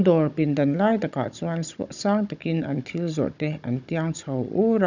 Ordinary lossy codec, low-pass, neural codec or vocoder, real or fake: none; 7.2 kHz; codec, 16 kHz, 16 kbps, FunCodec, trained on Chinese and English, 50 frames a second; fake